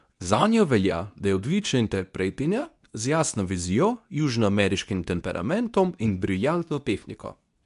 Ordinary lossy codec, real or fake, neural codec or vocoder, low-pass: none; fake; codec, 24 kHz, 0.9 kbps, WavTokenizer, medium speech release version 2; 10.8 kHz